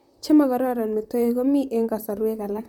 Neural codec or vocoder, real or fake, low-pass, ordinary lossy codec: vocoder, 44.1 kHz, 128 mel bands, Pupu-Vocoder; fake; 19.8 kHz; MP3, 96 kbps